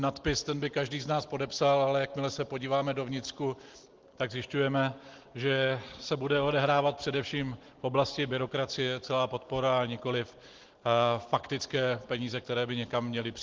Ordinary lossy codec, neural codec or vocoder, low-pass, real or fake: Opus, 16 kbps; none; 7.2 kHz; real